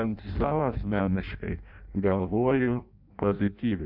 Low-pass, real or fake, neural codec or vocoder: 5.4 kHz; fake; codec, 16 kHz in and 24 kHz out, 0.6 kbps, FireRedTTS-2 codec